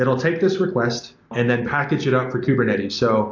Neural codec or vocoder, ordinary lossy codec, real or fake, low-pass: none; AAC, 48 kbps; real; 7.2 kHz